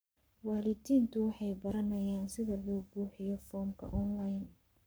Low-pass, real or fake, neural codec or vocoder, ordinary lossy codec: none; fake; codec, 44.1 kHz, 7.8 kbps, Pupu-Codec; none